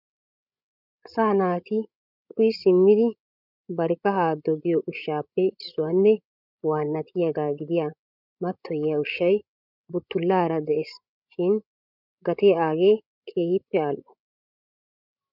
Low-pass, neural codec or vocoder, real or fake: 5.4 kHz; codec, 16 kHz, 16 kbps, FreqCodec, larger model; fake